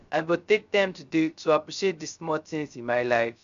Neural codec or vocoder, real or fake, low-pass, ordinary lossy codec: codec, 16 kHz, 0.3 kbps, FocalCodec; fake; 7.2 kHz; AAC, 96 kbps